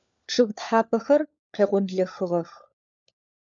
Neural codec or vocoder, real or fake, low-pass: codec, 16 kHz, 4 kbps, FunCodec, trained on LibriTTS, 50 frames a second; fake; 7.2 kHz